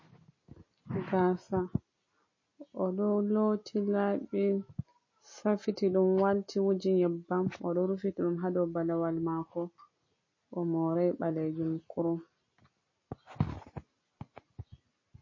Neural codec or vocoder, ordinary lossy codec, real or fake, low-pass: none; MP3, 32 kbps; real; 7.2 kHz